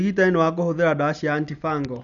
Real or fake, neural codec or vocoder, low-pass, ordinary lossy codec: real; none; 7.2 kHz; none